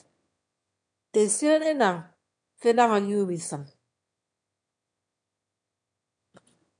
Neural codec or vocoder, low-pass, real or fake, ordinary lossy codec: autoencoder, 22.05 kHz, a latent of 192 numbers a frame, VITS, trained on one speaker; 9.9 kHz; fake; MP3, 96 kbps